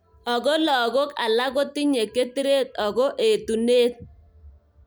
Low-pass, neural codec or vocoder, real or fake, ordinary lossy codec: none; none; real; none